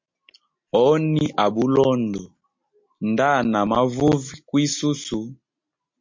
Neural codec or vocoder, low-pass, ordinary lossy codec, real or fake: none; 7.2 kHz; MP3, 48 kbps; real